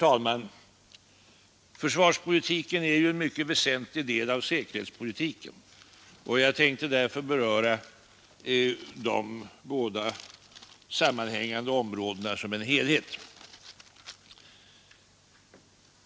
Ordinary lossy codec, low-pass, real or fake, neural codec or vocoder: none; none; real; none